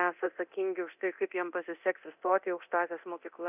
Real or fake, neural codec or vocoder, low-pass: fake; codec, 24 kHz, 0.9 kbps, DualCodec; 3.6 kHz